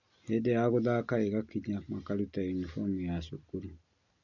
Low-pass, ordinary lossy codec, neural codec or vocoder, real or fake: 7.2 kHz; none; none; real